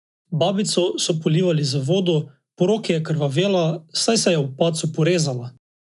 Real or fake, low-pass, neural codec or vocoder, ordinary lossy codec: real; 10.8 kHz; none; none